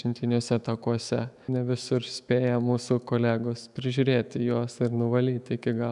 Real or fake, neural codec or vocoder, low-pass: fake; autoencoder, 48 kHz, 128 numbers a frame, DAC-VAE, trained on Japanese speech; 10.8 kHz